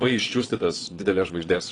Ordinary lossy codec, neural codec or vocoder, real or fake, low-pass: AAC, 32 kbps; vocoder, 22.05 kHz, 80 mel bands, WaveNeXt; fake; 9.9 kHz